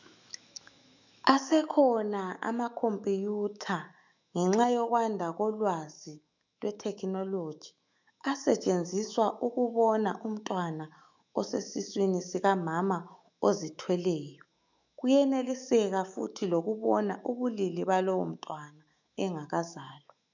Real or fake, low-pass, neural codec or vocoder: fake; 7.2 kHz; autoencoder, 48 kHz, 128 numbers a frame, DAC-VAE, trained on Japanese speech